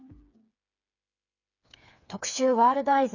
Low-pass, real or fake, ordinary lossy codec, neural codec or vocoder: 7.2 kHz; fake; none; codec, 16 kHz, 4 kbps, FreqCodec, smaller model